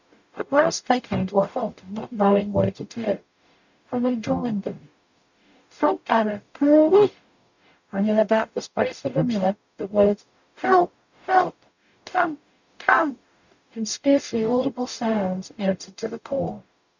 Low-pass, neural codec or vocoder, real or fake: 7.2 kHz; codec, 44.1 kHz, 0.9 kbps, DAC; fake